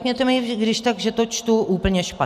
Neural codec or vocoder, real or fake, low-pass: none; real; 14.4 kHz